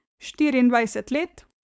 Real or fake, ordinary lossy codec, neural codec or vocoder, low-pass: fake; none; codec, 16 kHz, 4.8 kbps, FACodec; none